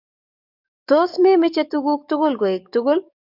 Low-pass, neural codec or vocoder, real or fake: 5.4 kHz; codec, 44.1 kHz, 7.8 kbps, DAC; fake